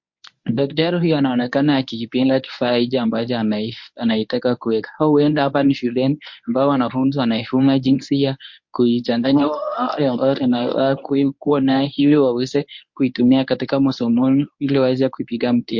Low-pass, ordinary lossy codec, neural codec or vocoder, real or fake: 7.2 kHz; MP3, 48 kbps; codec, 24 kHz, 0.9 kbps, WavTokenizer, medium speech release version 1; fake